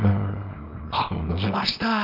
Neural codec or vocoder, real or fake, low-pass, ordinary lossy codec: codec, 24 kHz, 0.9 kbps, WavTokenizer, small release; fake; 5.4 kHz; none